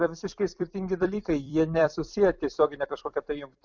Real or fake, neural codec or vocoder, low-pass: fake; vocoder, 44.1 kHz, 128 mel bands every 256 samples, BigVGAN v2; 7.2 kHz